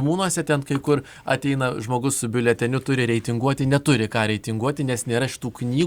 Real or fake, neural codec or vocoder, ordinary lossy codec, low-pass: real; none; Opus, 64 kbps; 19.8 kHz